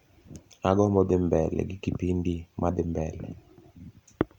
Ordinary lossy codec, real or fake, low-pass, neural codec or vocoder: Opus, 64 kbps; real; 19.8 kHz; none